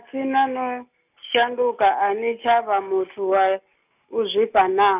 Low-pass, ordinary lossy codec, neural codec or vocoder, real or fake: 3.6 kHz; none; none; real